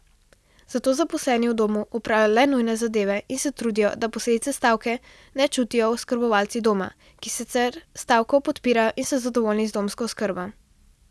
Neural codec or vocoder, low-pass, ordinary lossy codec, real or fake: none; none; none; real